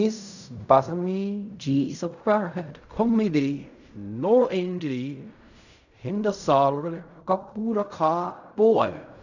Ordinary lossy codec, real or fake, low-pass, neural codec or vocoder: none; fake; 7.2 kHz; codec, 16 kHz in and 24 kHz out, 0.4 kbps, LongCat-Audio-Codec, fine tuned four codebook decoder